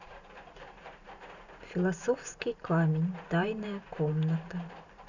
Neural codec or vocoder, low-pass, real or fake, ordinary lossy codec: vocoder, 44.1 kHz, 128 mel bands every 512 samples, BigVGAN v2; 7.2 kHz; fake; none